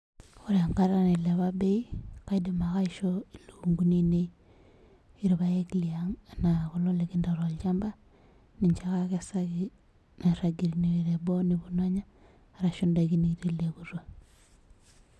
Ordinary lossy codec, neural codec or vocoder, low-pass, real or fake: none; none; none; real